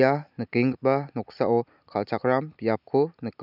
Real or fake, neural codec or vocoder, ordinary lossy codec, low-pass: real; none; none; 5.4 kHz